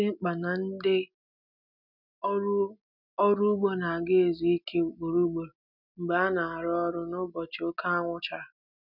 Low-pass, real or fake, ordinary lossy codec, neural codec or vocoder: 5.4 kHz; real; none; none